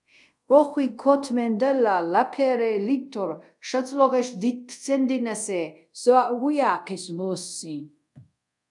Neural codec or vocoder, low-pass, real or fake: codec, 24 kHz, 0.5 kbps, DualCodec; 10.8 kHz; fake